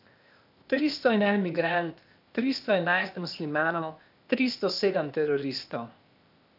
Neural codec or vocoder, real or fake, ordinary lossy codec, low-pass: codec, 16 kHz, 0.8 kbps, ZipCodec; fake; none; 5.4 kHz